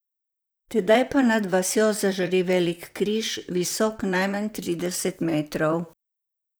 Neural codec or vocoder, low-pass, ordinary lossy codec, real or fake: vocoder, 44.1 kHz, 128 mel bands, Pupu-Vocoder; none; none; fake